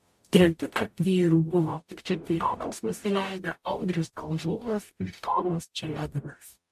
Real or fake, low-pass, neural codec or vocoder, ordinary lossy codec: fake; 14.4 kHz; codec, 44.1 kHz, 0.9 kbps, DAC; MP3, 64 kbps